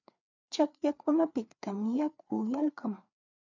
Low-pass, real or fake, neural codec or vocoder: 7.2 kHz; fake; codec, 16 kHz, 4 kbps, FreqCodec, larger model